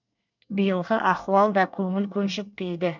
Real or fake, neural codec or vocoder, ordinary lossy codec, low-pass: fake; codec, 24 kHz, 1 kbps, SNAC; none; 7.2 kHz